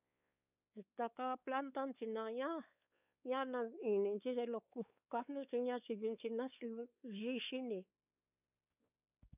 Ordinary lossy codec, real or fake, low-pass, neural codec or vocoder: none; fake; 3.6 kHz; codec, 16 kHz, 4 kbps, X-Codec, WavLM features, trained on Multilingual LibriSpeech